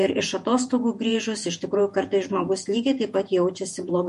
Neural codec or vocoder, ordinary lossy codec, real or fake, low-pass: vocoder, 48 kHz, 128 mel bands, Vocos; MP3, 48 kbps; fake; 14.4 kHz